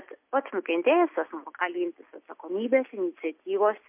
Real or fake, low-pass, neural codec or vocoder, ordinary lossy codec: real; 3.6 kHz; none; MP3, 32 kbps